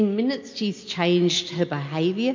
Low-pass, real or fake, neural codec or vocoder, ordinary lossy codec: 7.2 kHz; real; none; MP3, 48 kbps